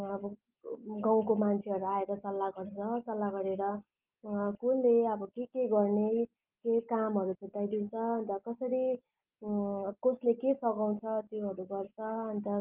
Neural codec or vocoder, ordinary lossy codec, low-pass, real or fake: none; Opus, 32 kbps; 3.6 kHz; real